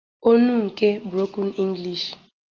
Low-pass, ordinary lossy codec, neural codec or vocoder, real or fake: 7.2 kHz; Opus, 32 kbps; none; real